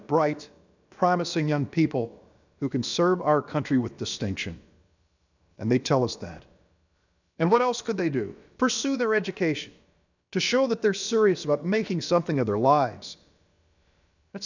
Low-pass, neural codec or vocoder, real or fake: 7.2 kHz; codec, 16 kHz, about 1 kbps, DyCAST, with the encoder's durations; fake